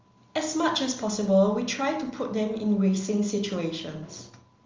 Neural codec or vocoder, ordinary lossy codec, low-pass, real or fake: none; Opus, 32 kbps; 7.2 kHz; real